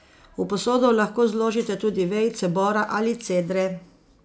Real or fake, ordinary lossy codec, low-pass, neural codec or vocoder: real; none; none; none